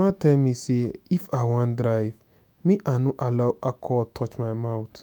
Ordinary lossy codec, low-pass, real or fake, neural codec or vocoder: none; none; fake; autoencoder, 48 kHz, 128 numbers a frame, DAC-VAE, trained on Japanese speech